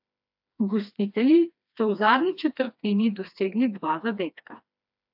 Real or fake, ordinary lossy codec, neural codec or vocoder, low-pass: fake; AAC, 48 kbps; codec, 16 kHz, 2 kbps, FreqCodec, smaller model; 5.4 kHz